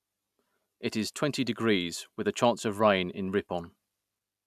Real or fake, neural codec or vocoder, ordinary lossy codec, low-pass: real; none; none; 14.4 kHz